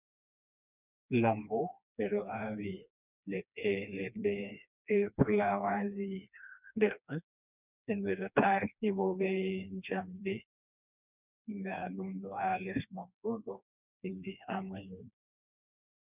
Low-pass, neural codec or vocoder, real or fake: 3.6 kHz; codec, 16 kHz, 2 kbps, FreqCodec, smaller model; fake